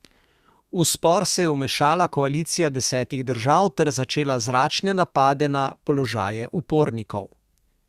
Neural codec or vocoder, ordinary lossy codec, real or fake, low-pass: codec, 32 kHz, 1.9 kbps, SNAC; Opus, 64 kbps; fake; 14.4 kHz